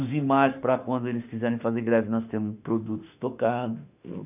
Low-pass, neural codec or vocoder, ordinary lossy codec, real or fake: 3.6 kHz; autoencoder, 48 kHz, 32 numbers a frame, DAC-VAE, trained on Japanese speech; none; fake